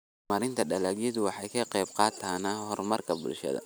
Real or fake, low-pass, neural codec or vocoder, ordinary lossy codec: real; none; none; none